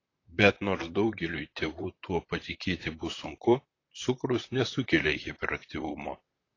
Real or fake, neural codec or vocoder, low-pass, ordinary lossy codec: fake; vocoder, 44.1 kHz, 128 mel bands, Pupu-Vocoder; 7.2 kHz; AAC, 32 kbps